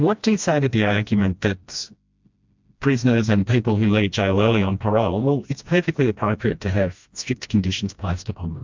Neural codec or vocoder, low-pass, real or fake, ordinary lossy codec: codec, 16 kHz, 1 kbps, FreqCodec, smaller model; 7.2 kHz; fake; MP3, 64 kbps